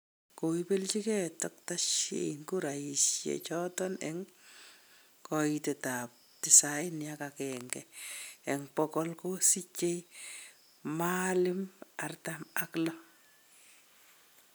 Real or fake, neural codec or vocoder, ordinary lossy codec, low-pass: real; none; none; none